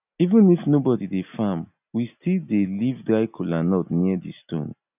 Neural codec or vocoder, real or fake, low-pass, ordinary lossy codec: none; real; 3.6 kHz; none